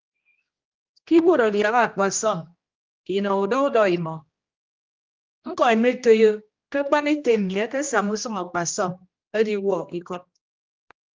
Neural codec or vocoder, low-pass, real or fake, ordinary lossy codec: codec, 16 kHz, 1 kbps, X-Codec, HuBERT features, trained on general audio; 7.2 kHz; fake; Opus, 24 kbps